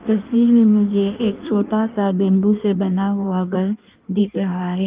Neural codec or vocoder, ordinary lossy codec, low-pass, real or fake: codec, 16 kHz in and 24 kHz out, 1.1 kbps, FireRedTTS-2 codec; Opus, 24 kbps; 3.6 kHz; fake